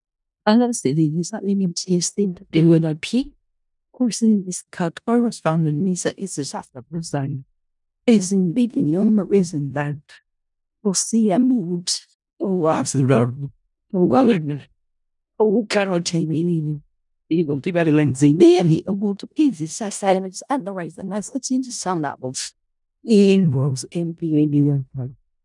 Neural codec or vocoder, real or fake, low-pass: codec, 16 kHz in and 24 kHz out, 0.4 kbps, LongCat-Audio-Codec, four codebook decoder; fake; 10.8 kHz